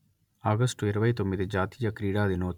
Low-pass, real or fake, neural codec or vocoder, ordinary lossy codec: 19.8 kHz; real; none; none